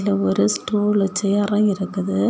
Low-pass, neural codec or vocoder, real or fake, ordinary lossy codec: none; none; real; none